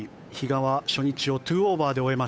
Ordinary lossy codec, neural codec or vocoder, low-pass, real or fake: none; codec, 16 kHz, 8 kbps, FunCodec, trained on Chinese and English, 25 frames a second; none; fake